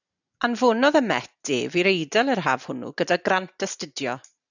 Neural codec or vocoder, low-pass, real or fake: none; 7.2 kHz; real